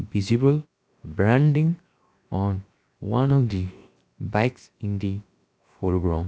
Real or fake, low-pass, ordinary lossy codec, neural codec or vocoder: fake; none; none; codec, 16 kHz, 0.7 kbps, FocalCodec